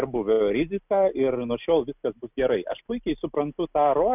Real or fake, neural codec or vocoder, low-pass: real; none; 3.6 kHz